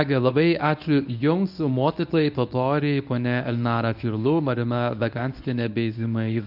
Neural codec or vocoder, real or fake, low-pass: codec, 24 kHz, 0.9 kbps, WavTokenizer, medium speech release version 1; fake; 5.4 kHz